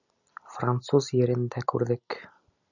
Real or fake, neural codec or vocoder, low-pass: fake; vocoder, 44.1 kHz, 128 mel bands every 256 samples, BigVGAN v2; 7.2 kHz